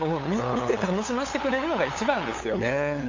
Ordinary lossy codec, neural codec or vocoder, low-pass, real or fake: AAC, 48 kbps; codec, 16 kHz, 8 kbps, FunCodec, trained on LibriTTS, 25 frames a second; 7.2 kHz; fake